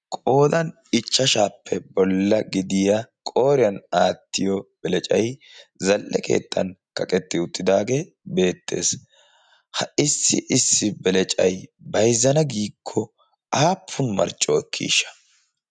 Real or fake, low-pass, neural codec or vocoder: real; 9.9 kHz; none